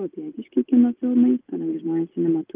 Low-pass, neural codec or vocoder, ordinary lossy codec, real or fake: 3.6 kHz; none; Opus, 24 kbps; real